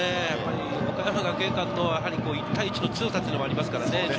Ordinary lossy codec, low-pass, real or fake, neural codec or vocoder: none; none; real; none